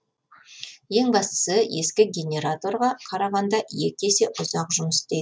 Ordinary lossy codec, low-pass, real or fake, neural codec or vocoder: none; none; real; none